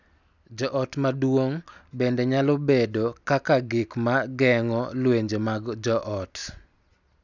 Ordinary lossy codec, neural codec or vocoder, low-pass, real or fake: none; none; 7.2 kHz; real